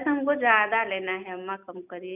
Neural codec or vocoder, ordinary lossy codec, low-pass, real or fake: none; none; 3.6 kHz; real